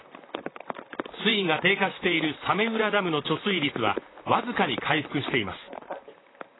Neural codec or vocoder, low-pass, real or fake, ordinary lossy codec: vocoder, 44.1 kHz, 128 mel bands, Pupu-Vocoder; 7.2 kHz; fake; AAC, 16 kbps